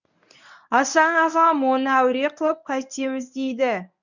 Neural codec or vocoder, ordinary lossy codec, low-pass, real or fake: codec, 24 kHz, 0.9 kbps, WavTokenizer, medium speech release version 1; none; 7.2 kHz; fake